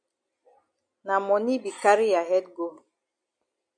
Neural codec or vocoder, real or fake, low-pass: none; real; 9.9 kHz